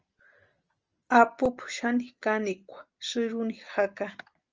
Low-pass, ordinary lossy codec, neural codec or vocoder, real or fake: 7.2 kHz; Opus, 24 kbps; none; real